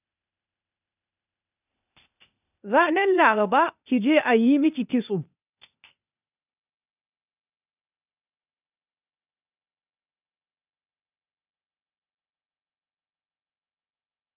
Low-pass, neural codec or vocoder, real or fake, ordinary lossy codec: 3.6 kHz; codec, 16 kHz, 0.8 kbps, ZipCodec; fake; none